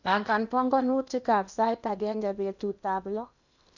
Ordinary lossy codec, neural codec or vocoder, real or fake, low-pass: none; codec, 16 kHz in and 24 kHz out, 0.8 kbps, FocalCodec, streaming, 65536 codes; fake; 7.2 kHz